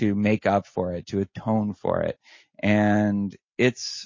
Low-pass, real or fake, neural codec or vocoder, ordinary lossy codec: 7.2 kHz; real; none; MP3, 32 kbps